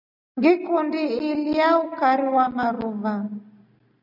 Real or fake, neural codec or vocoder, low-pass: real; none; 5.4 kHz